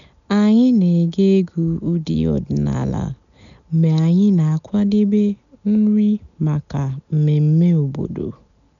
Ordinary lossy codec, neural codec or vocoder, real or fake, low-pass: none; none; real; 7.2 kHz